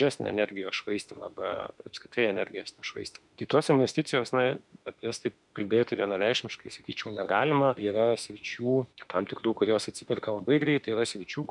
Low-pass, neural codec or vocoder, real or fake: 10.8 kHz; autoencoder, 48 kHz, 32 numbers a frame, DAC-VAE, trained on Japanese speech; fake